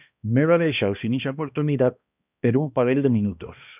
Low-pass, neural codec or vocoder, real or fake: 3.6 kHz; codec, 16 kHz, 1 kbps, X-Codec, HuBERT features, trained on balanced general audio; fake